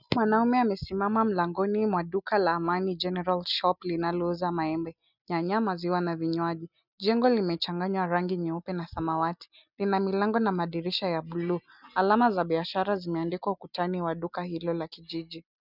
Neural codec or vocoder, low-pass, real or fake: none; 5.4 kHz; real